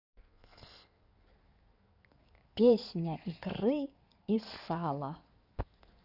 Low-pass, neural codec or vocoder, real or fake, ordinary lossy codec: 5.4 kHz; codec, 16 kHz in and 24 kHz out, 2.2 kbps, FireRedTTS-2 codec; fake; none